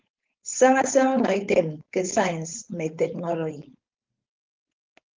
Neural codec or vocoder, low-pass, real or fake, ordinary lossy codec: codec, 16 kHz, 4.8 kbps, FACodec; 7.2 kHz; fake; Opus, 32 kbps